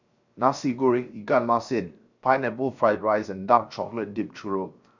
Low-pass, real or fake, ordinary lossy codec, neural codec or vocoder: 7.2 kHz; fake; none; codec, 16 kHz, 0.7 kbps, FocalCodec